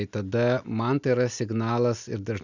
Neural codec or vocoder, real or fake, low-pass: none; real; 7.2 kHz